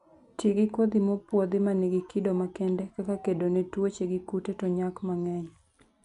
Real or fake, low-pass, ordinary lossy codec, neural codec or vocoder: real; 10.8 kHz; none; none